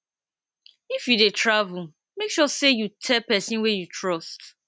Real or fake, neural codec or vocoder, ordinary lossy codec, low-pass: real; none; none; none